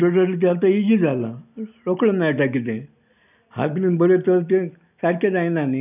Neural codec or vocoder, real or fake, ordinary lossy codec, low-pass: none; real; none; 3.6 kHz